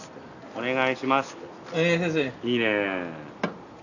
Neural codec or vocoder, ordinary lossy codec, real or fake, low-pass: none; none; real; 7.2 kHz